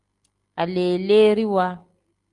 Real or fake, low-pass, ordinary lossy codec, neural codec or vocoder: real; 10.8 kHz; Opus, 24 kbps; none